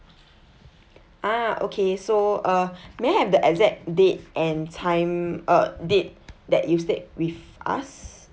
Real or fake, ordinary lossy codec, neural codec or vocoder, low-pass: real; none; none; none